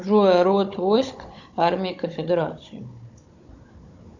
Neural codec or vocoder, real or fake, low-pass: codec, 16 kHz, 16 kbps, FunCodec, trained on Chinese and English, 50 frames a second; fake; 7.2 kHz